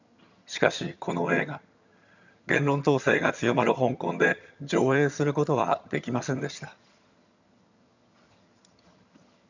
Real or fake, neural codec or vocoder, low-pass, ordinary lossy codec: fake; vocoder, 22.05 kHz, 80 mel bands, HiFi-GAN; 7.2 kHz; none